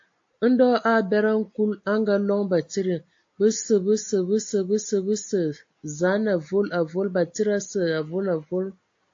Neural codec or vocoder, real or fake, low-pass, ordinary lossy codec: none; real; 7.2 kHz; AAC, 48 kbps